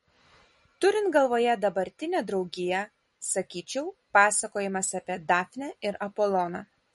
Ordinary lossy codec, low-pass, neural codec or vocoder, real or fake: MP3, 48 kbps; 19.8 kHz; none; real